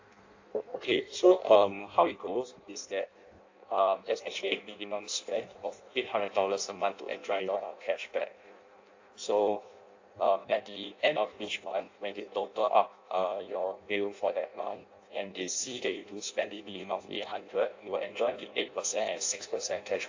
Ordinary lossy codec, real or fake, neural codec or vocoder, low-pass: AAC, 48 kbps; fake; codec, 16 kHz in and 24 kHz out, 0.6 kbps, FireRedTTS-2 codec; 7.2 kHz